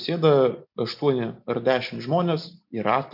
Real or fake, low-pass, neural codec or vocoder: real; 5.4 kHz; none